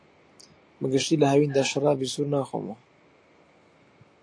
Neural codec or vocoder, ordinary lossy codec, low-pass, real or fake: none; AAC, 48 kbps; 9.9 kHz; real